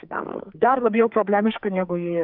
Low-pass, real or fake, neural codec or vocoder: 5.4 kHz; fake; codec, 44.1 kHz, 2.6 kbps, SNAC